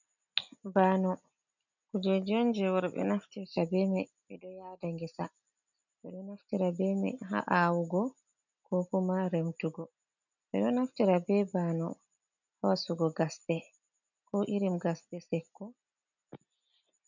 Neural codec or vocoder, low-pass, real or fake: none; 7.2 kHz; real